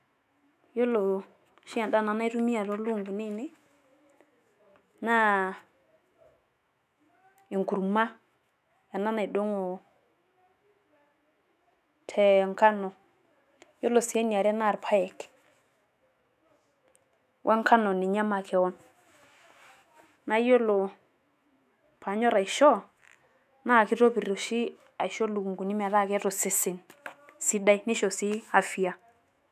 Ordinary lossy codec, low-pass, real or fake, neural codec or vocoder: none; 14.4 kHz; fake; autoencoder, 48 kHz, 128 numbers a frame, DAC-VAE, trained on Japanese speech